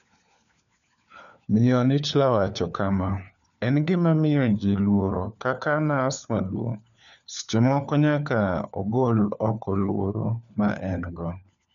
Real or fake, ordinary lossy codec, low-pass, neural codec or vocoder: fake; none; 7.2 kHz; codec, 16 kHz, 4 kbps, FunCodec, trained on LibriTTS, 50 frames a second